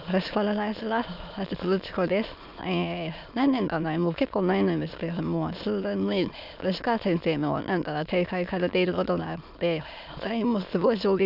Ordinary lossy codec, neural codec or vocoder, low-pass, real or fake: none; autoencoder, 22.05 kHz, a latent of 192 numbers a frame, VITS, trained on many speakers; 5.4 kHz; fake